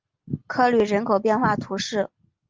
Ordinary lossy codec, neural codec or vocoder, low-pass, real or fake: Opus, 32 kbps; none; 7.2 kHz; real